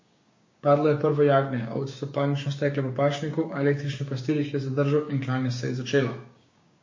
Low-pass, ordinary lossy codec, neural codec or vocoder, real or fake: 7.2 kHz; MP3, 32 kbps; codec, 16 kHz, 6 kbps, DAC; fake